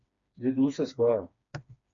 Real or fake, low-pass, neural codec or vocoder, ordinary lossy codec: fake; 7.2 kHz; codec, 16 kHz, 2 kbps, FreqCodec, smaller model; MP3, 64 kbps